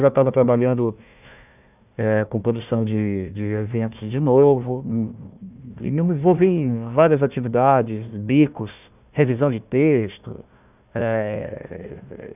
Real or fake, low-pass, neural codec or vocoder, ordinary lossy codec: fake; 3.6 kHz; codec, 16 kHz, 1 kbps, FunCodec, trained on Chinese and English, 50 frames a second; none